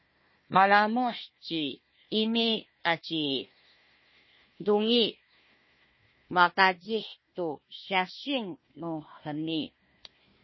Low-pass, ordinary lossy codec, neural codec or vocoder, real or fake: 7.2 kHz; MP3, 24 kbps; codec, 16 kHz, 1 kbps, FunCodec, trained on Chinese and English, 50 frames a second; fake